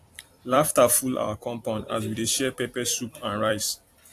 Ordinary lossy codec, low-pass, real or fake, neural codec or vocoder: AAC, 64 kbps; 14.4 kHz; fake; vocoder, 44.1 kHz, 128 mel bands every 256 samples, BigVGAN v2